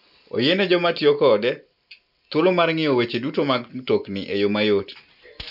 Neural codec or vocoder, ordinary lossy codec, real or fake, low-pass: none; none; real; 5.4 kHz